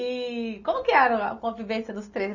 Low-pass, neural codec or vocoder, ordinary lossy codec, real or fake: 7.2 kHz; none; none; real